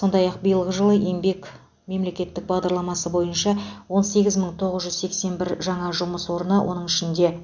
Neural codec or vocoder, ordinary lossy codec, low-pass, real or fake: none; none; 7.2 kHz; real